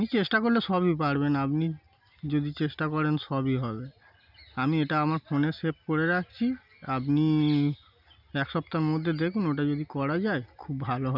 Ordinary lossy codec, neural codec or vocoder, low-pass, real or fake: none; none; 5.4 kHz; real